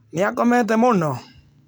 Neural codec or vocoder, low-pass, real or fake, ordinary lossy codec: none; none; real; none